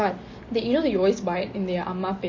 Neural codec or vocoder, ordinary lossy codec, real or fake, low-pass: none; MP3, 32 kbps; real; 7.2 kHz